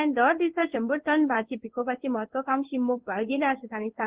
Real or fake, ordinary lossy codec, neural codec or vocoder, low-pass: fake; Opus, 16 kbps; codec, 16 kHz in and 24 kHz out, 1 kbps, XY-Tokenizer; 3.6 kHz